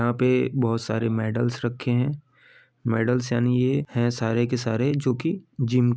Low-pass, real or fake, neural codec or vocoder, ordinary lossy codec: none; real; none; none